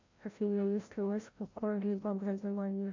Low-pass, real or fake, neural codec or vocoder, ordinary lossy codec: 7.2 kHz; fake; codec, 16 kHz, 0.5 kbps, FreqCodec, larger model; MP3, 48 kbps